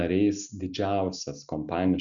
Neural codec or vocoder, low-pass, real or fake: none; 7.2 kHz; real